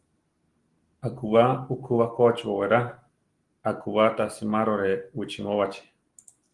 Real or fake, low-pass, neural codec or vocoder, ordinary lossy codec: real; 10.8 kHz; none; Opus, 24 kbps